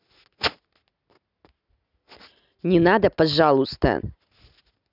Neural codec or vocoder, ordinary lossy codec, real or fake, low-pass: none; none; real; 5.4 kHz